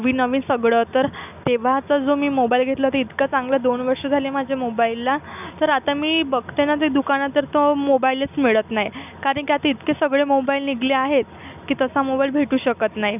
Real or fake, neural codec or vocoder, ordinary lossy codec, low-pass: real; none; none; 3.6 kHz